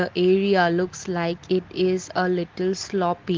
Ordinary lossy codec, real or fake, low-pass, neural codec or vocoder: Opus, 16 kbps; real; 7.2 kHz; none